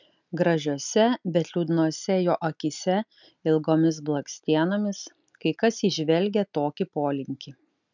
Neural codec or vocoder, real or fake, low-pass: none; real; 7.2 kHz